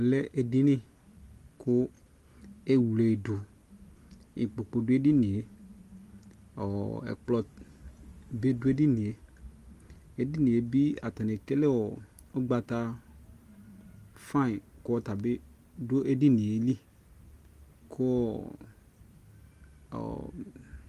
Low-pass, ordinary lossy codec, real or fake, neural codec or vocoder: 14.4 kHz; Opus, 24 kbps; real; none